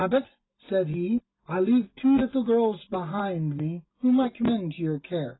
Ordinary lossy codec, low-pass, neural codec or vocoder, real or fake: AAC, 16 kbps; 7.2 kHz; none; real